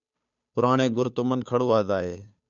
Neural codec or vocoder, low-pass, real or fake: codec, 16 kHz, 2 kbps, FunCodec, trained on Chinese and English, 25 frames a second; 7.2 kHz; fake